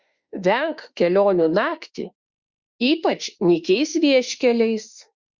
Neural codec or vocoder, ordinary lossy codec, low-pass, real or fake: autoencoder, 48 kHz, 32 numbers a frame, DAC-VAE, trained on Japanese speech; Opus, 64 kbps; 7.2 kHz; fake